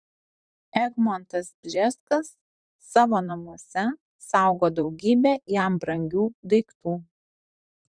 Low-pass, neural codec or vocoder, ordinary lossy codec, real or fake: 9.9 kHz; vocoder, 44.1 kHz, 128 mel bands, Pupu-Vocoder; Opus, 64 kbps; fake